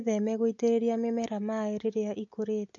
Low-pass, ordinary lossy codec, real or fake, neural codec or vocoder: 7.2 kHz; AAC, 48 kbps; real; none